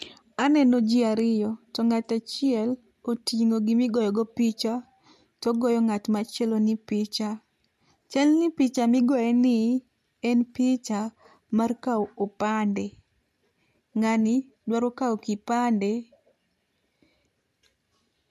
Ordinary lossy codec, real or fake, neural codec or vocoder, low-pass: MP3, 64 kbps; real; none; 14.4 kHz